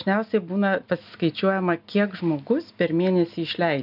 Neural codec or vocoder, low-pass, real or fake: none; 5.4 kHz; real